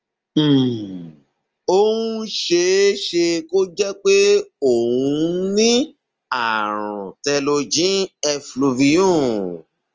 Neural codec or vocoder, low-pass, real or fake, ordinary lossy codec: none; 7.2 kHz; real; Opus, 24 kbps